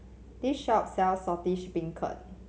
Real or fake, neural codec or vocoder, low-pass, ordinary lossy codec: real; none; none; none